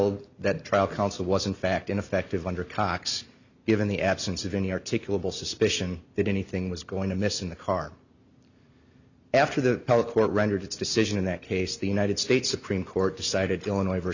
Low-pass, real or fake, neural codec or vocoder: 7.2 kHz; real; none